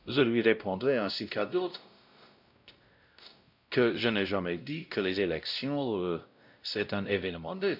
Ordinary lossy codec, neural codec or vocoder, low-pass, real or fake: none; codec, 16 kHz, 0.5 kbps, X-Codec, WavLM features, trained on Multilingual LibriSpeech; 5.4 kHz; fake